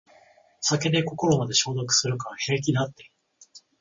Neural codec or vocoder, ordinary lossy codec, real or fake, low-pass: none; MP3, 32 kbps; real; 7.2 kHz